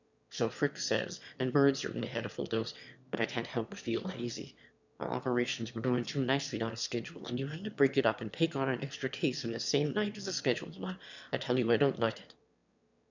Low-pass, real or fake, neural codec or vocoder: 7.2 kHz; fake; autoencoder, 22.05 kHz, a latent of 192 numbers a frame, VITS, trained on one speaker